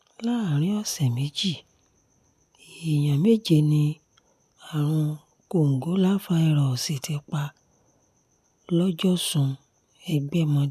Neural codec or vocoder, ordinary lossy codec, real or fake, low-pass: none; none; real; 14.4 kHz